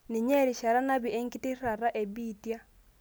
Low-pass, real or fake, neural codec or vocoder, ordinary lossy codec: none; real; none; none